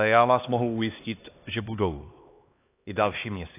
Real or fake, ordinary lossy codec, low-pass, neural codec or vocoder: fake; AAC, 24 kbps; 3.6 kHz; codec, 16 kHz, 2 kbps, X-Codec, HuBERT features, trained on LibriSpeech